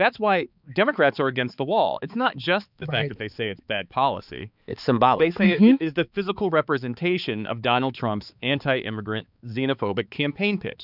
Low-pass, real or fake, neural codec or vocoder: 5.4 kHz; fake; codec, 16 kHz, 4 kbps, X-Codec, HuBERT features, trained on balanced general audio